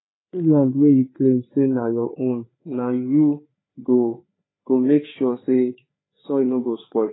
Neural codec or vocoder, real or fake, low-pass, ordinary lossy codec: codec, 16 kHz, 4 kbps, FreqCodec, larger model; fake; 7.2 kHz; AAC, 16 kbps